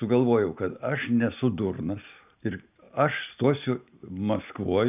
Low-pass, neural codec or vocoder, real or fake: 3.6 kHz; none; real